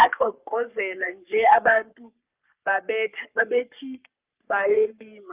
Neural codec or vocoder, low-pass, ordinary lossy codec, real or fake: codec, 44.1 kHz, 3.4 kbps, Pupu-Codec; 3.6 kHz; Opus, 32 kbps; fake